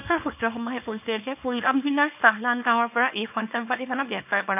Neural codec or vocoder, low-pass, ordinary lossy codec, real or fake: codec, 24 kHz, 0.9 kbps, WavTokenizer, small release; 3.6 kHz; none; fake